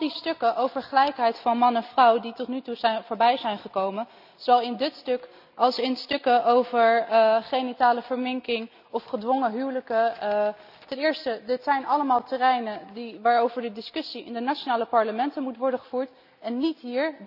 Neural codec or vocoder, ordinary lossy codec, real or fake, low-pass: none; none; real; 5.4 kHz